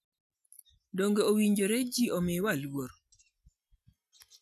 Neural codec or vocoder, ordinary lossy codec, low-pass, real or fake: none; none; 14.4 kHz; real